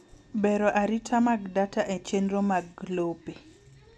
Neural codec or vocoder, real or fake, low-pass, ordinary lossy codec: none; real; none; none